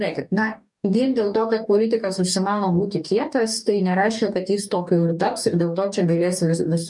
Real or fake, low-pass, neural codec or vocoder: fake; 10.8 kHz; codec, 44.1 kHz, 2.6 kbps, DAC